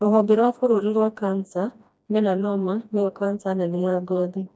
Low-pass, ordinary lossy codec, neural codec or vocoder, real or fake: none; none; codec, 16 kHz, 1 kbps, FreqCodec, smaller model; fake